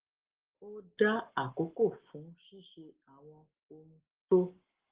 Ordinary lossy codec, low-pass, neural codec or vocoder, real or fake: Opus, 16 kbps; 3.6 kHz; none; real